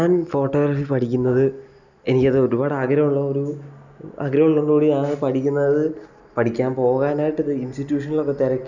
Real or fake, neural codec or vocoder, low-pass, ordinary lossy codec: real; none; 7.2 kHz; none